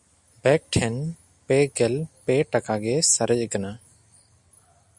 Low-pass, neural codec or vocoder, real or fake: 10.8 kHz; none; real